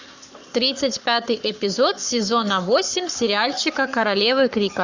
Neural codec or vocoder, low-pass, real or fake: codec, 44.1 kHz, 7.8 kbps, Pupu-Codec; 7.2 kHz; fake